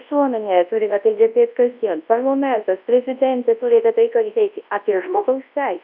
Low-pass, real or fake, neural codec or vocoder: 5.4 kHz; fake; codec, 24 kHz, 0.9 kbps, WavTokenizer, large speech release